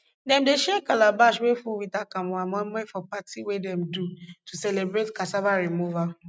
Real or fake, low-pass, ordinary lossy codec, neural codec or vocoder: real; none; none; none